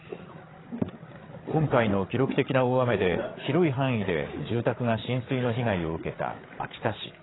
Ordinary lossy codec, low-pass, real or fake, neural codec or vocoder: AAC, 16 kbps; 7.2 kHz; fake; codec, 16 kHz, 8 kbps, FreqCodec, larger model